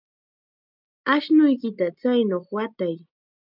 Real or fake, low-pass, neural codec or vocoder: real; 5.4 kHz; none